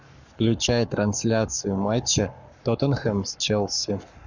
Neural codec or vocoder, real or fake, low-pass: codec, 44.1 kHz, 7.8 kbps, Pupu-Codec; fake; 7.2 kHz